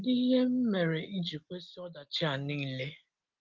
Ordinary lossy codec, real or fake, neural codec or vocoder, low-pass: Opus, 32 kbps; real; none; 7.2 kHz